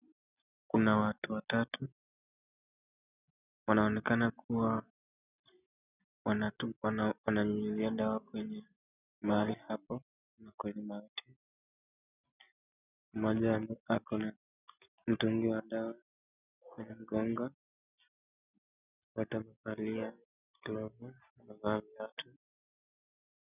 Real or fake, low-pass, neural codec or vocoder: real; 3.6 kHz; none